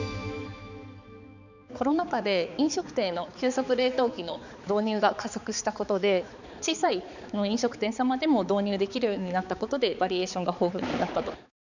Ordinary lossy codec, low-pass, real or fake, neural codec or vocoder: none; 7.2 kHz; fake; codec, 16 kHz, 4 kbps, X-Codec, HuBERT features, trained on balanced general audio